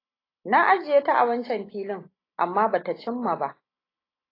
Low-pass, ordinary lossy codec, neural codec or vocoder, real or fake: 5.4 kHz; AAC, 24 kbps; none; real